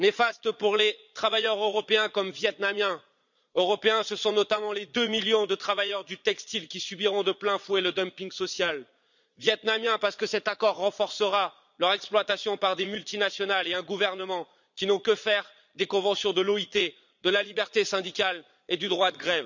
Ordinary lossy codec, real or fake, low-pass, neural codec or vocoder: none; fake; 7.2 kHz; vocoder, 22.05 kHz, 80 mel bands, Vocos